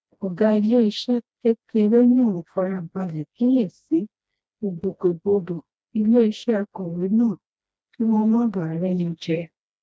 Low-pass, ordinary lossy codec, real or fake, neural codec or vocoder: none; none; fake; codec, 16 kHz, 1 kbps, FreqCodec, smaller model